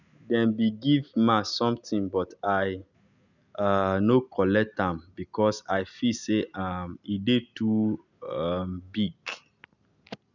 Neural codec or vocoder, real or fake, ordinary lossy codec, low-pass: none; real; none; 7.2 kHz